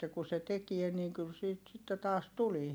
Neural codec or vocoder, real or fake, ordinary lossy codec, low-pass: none; real; none; none